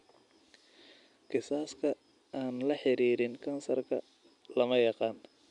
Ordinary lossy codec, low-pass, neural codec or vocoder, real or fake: none; 10.8 kHz; none; real